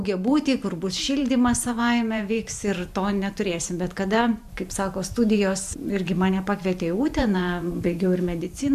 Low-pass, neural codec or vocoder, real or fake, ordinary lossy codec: 14.4 kHz; vocoder, 44.1 kHz, 128 mel bands every 512 samples, BigVGAN v2; fake; MP3, 96 kbps